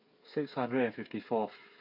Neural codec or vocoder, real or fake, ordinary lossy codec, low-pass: codec, 16 kHz, 8 kbps, FreqCodec, smaller model; fake; MP3, 32 kbps; 5.4 kHz